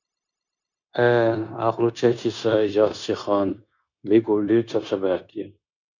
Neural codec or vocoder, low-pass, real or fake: codec, 16 kHz, 0.9 kbps, LongCat-Audio-Codec; 7.2 kHz; fake